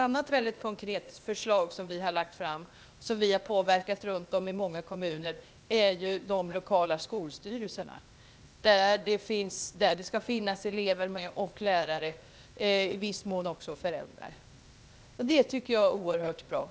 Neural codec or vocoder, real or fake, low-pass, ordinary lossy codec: codec, 16 kHz, 0.8 kbps, ZipCodec; fake; none; none